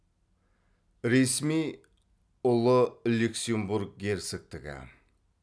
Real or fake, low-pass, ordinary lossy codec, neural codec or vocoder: real; none; none; none